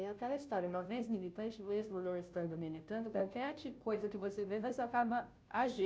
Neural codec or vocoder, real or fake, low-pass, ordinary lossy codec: codec, 16 kHz, 0.5 kbps, FunCodec, trained on Chinese and English, 25 frames a second; fake; none; none